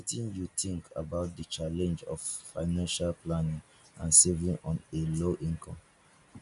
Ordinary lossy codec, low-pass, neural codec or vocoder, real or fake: none; 10.8 kHz; none; real